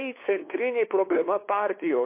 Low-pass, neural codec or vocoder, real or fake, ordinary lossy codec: 3.6 kHz; codec, 16 kHz, 2 kbps, FunCodec, trained on LibriTTS, 25 frames a second; fake; MP3, 24 kbps